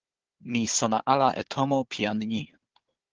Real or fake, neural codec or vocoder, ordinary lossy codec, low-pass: fake; codec, 16 kHz, 4 kbps, FunCodec, trained on Chinese and English, 50 frames a second; Opus, 16 kbps; 7.2 kHz